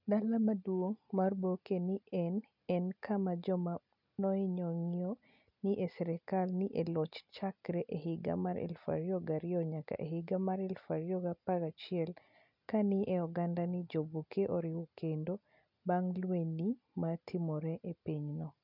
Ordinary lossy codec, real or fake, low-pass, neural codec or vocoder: none; real; 5.4 kHz; none